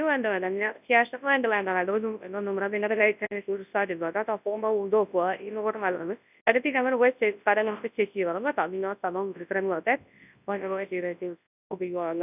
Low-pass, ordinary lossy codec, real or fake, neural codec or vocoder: 3.6 kHz; none; fake; codec, 24 kHz, 0.9 kbps, WavTokenizer, large speech release